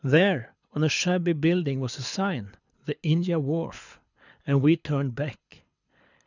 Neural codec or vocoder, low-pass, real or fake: codec, 24 kHz, 6 kbps, HILCodec; 7.2 kHz; fake